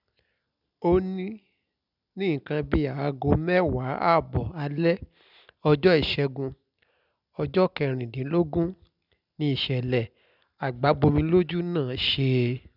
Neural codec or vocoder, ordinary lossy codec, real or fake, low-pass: none; none; real; 5.4 kHz